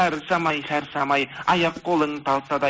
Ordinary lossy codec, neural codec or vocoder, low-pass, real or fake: none; none; none; real